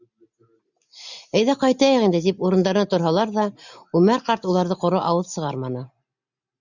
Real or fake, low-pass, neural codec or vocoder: real; 7.2 kHz; none